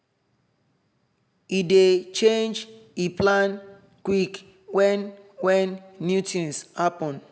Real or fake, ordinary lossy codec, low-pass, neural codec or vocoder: real; none; none; none